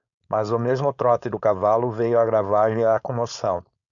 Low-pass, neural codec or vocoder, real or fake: 7.2 kHz; codec, 16 kHz, 4.8 kbps, FACodec; fake